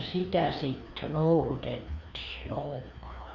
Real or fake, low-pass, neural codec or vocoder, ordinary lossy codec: fake; 7.2 kHz; codec, 16 kHz, 2 kbps, FreqCodec, larger model; none